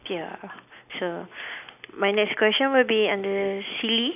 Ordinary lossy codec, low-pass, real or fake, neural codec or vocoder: none; 3.6 kHz; real; none